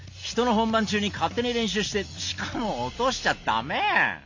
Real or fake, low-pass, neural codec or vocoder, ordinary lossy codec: real; 7.2 kHz; none; MP3, 48 kbps